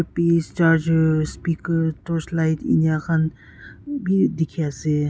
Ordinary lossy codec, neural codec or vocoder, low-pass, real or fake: none; none; none; real